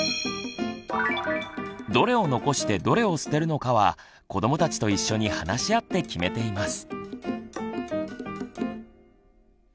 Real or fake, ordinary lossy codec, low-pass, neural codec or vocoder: real; none; none; none